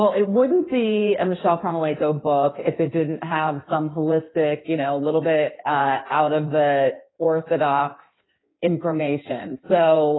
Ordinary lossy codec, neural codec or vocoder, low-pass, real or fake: AAC, 16 kbps; codec, 16 kHz in and 24 kHz out, 1.1 kbps, FireRedTTS-2 codec; 7.2 kHz; fake